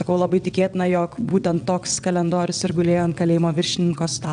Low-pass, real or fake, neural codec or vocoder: 9.9 kHz; fake; vocoder, 22.05 kHz, 80 mel bands, WaveNeXt